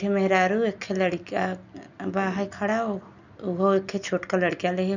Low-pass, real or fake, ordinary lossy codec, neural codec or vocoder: 7.2 kHz; real; none; none